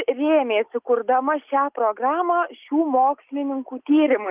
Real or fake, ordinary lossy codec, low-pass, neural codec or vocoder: real; Opus, 32 kbps; 3.6 kHz; none